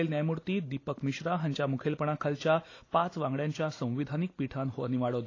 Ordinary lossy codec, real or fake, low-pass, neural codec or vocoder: AAC, 32 kbps; real; 7.2 kHz; none